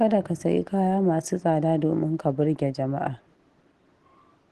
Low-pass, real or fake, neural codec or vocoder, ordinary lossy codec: 10.8 kHz; real; none; Opus, 16 kbps